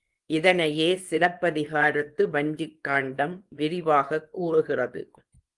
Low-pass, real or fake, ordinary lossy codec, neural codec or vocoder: 10.8 kHz; fake; Opus, 24 kbps; codec, 24 kHz, 0.9 kbps, WavTokenizer, small release